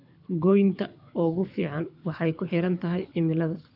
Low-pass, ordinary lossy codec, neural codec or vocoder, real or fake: 5.4 kHz; AAC, 48 kbps; codec, 24 kHz, 6 kbps, HILCodec; fake